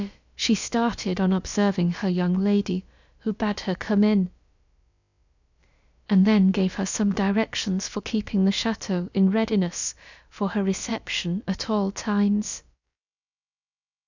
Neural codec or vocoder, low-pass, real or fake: codec, 16 kHz, about 1 kbps, DyCAST, with the encoder's durations; 7.2 kHz; fake